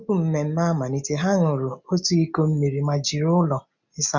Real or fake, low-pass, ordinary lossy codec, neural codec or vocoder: real; 7.2 kHz; none; none